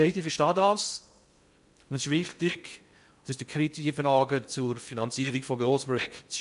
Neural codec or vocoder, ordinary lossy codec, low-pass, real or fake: codec, 16 kHz in and 24 kHz out, 0.6 kbps, FocalCodec, streaming, 2048 codes; MP3, 64 kbps; 10.8 kHz; fake